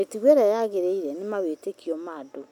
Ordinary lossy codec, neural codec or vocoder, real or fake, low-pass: none; none; real; 19.8 kHz